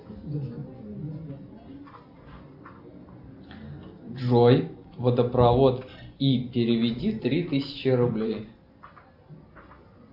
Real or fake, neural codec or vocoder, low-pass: real; none; 5.4 kHz